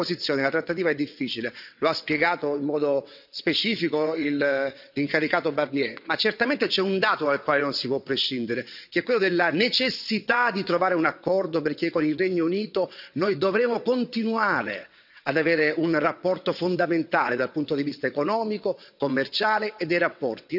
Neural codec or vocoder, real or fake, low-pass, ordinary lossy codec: vocoder, 22.05 kHz, 80 mel bands, WaveNeXt; fake; 5.4 kHz; none